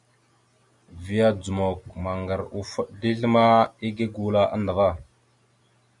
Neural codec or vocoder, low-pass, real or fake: none; 10.8 kHz; real